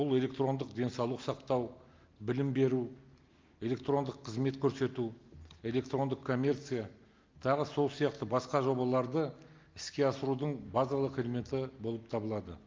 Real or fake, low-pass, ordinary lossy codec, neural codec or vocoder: real; 7.2 kHz; Opus, 32 kbps; none